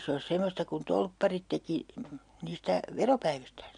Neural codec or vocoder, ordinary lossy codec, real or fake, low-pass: none; none; real; 9.9 kHz